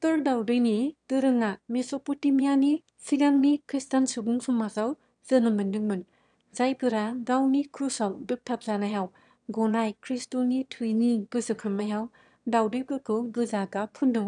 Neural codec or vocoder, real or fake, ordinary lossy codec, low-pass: autoencoder, 22.05 kHz, a latent of 192 numbers a frame, VITS, trained on one speaker; fake; none; 9.9 kHz